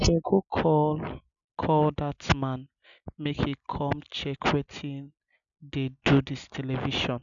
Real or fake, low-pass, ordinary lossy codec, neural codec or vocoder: real; 7.2 kHz; none; none